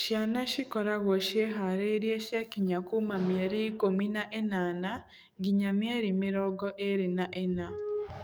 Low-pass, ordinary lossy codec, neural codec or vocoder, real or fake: none; none; codec, 44.1 kHz, 7.8 kbps, DAC; fake